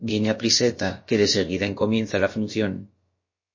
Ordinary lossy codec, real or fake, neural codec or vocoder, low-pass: MP3, 32 kbps; fake; codec, 16 kHz, about 1 kbps, DyCAST, with the encoder's durations; 7.2 kHz